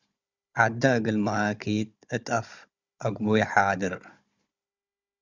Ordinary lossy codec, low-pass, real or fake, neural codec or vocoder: Opus, 64 kbps; 7.2 kHz; fake; codec, 16 kHz, 16 kbps, FunCodec, trained on Chinese and English, 50 frames a second